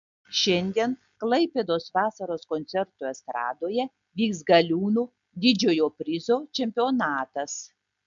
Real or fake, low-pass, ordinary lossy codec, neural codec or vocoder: real; 7.2 kHz; MP3, 64 kbps; none